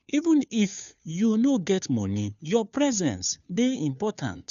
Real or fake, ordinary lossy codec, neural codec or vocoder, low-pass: fake; none; codec, 16 kHz, 2 kbps, FunCodec, trained on Chinese and English, 25 frames a second; 7.2 kHz